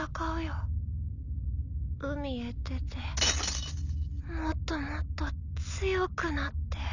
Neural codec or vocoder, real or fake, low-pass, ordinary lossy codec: none; real; 7.2 kHz; none